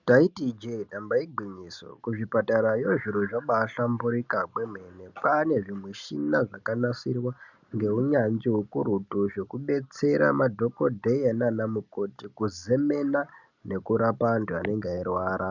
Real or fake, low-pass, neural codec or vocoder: real; 7.2 kHz; none